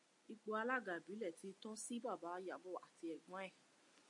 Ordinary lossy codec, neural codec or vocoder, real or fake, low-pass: MP3, 48 kbps; none; real; 10.8 kHz